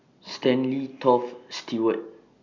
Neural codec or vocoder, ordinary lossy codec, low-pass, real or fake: none; none; 7.2 kHz; real